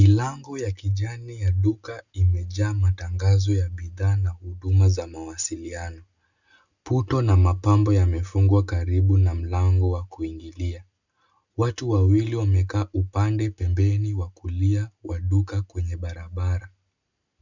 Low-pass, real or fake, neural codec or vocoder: 7.2 kHz; real; none